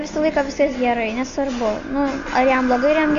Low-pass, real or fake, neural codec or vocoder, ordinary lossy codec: 7.2 kHz; real; none; MP3, 48 kbps